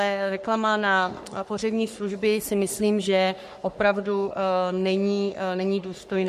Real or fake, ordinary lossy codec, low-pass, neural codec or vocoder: fake; MP3, 64 kbps; 14.4 kHz; codec, 44.1 kHz, 3.4 kbps, Pupu-Codec